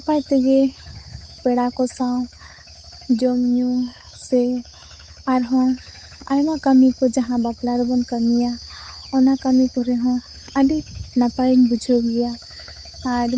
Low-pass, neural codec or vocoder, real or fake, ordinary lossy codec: none; codec, 16 kHz, 8 kbps, FunCodec, trained on Chinese and English, 25 frames a second; fake; none